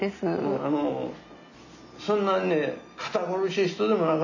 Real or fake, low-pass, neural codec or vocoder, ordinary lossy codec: real; 7.2 kHz; none; none